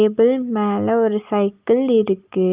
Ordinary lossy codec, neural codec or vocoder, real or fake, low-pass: Opus, 24 kbps; none; real; 3.6 kHz